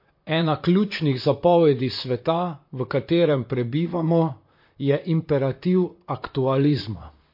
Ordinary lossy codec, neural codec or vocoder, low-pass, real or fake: MP3, 32 kbps; vocoder, 44.1 kHz, 128 mel bands, Pupu-Vocoder; 5.4 kHz; fake